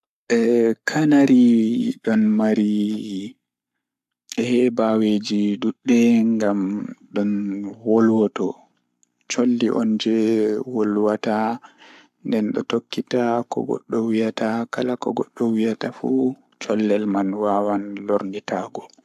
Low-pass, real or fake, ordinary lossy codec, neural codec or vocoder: 14.4 kHz; fake; AAC, 96 kbps; codec, 44.1 kHz, 7.8 kbps, Pupu-Codec